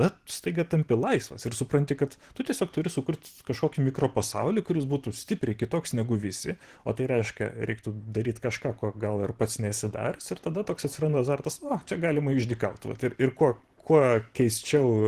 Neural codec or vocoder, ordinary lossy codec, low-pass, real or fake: vocoder, 44.1 kHz, 128 mel bands every 512 samples, BigVGAN v2; Opus, 16 kbps; 14.4 kHz; fake